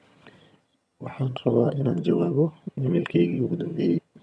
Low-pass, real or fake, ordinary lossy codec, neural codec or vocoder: none; fake; none; vocoder, 22.05 kHz, 80 mel bands, HiFi-GAN